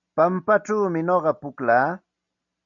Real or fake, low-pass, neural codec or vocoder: real; 7.2 kHz; none